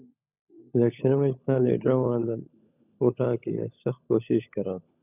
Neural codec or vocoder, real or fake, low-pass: codec, 16 kHz, 16 kbps, FunCodec, trained on LibriTTS, 50 frames a second; fake; 3.6 kHz